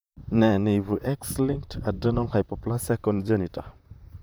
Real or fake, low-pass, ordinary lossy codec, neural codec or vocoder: fake; none; none; vocoder, 44.1 kHz, 128 mel bands, Pupu-Vocoder